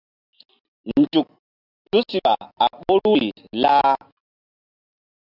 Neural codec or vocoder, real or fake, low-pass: none; real; 5.4 kHz